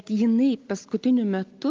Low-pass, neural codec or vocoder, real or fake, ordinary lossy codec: 7.2 kHz; none; real; Opus, 32 kbps